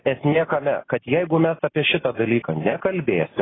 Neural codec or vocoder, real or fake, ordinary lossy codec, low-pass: none; real; AAC, 16 kbps; 7.2 kHz